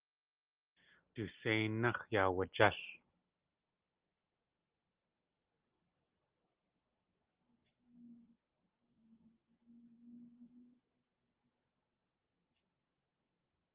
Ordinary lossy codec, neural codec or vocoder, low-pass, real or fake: Opus, 32 kbps; none; 3.6 kHz; real